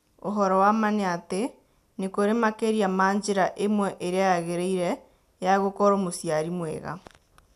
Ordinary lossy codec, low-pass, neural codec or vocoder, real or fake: none; 14.4 kHz; none; real